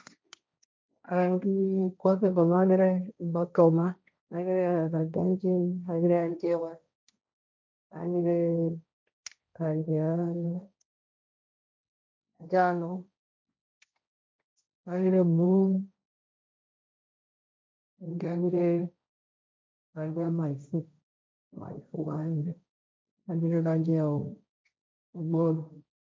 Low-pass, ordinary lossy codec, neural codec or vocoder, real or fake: none; none; codec, 16 kHz, 1.1 kbps, Voila-Tokenizer; fake